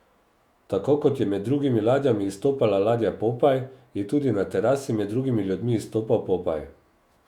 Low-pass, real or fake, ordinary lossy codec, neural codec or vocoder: 19.8 kHz; fake; Opus, 64 kbps; autoencoder, 48 kHz, 128 numbers a frame, DAC-VAE, trained on Japanese speech